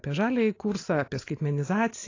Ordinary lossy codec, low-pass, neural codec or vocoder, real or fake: AAC, 32 kbps; 7.2 kHz; none; real